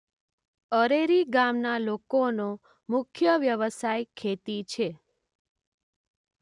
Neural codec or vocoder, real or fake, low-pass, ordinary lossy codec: none; real; 10.8 kHz; none